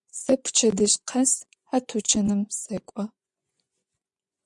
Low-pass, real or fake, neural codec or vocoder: 10.8 kHz; fake; vocoder, 24 kHz, 100 mel bands, Vocos